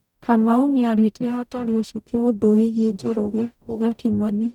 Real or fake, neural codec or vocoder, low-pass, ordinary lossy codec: fake; codec, 44.1 kHz, 0.9 kbps, DAC; 19.8 kHz; none